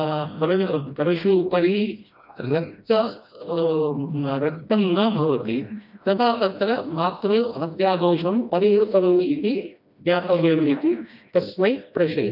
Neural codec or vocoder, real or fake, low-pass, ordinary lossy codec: codec, 16 kHz, 1 kbps, FreqCodec, smaller model; fake; 5.4 kHz; none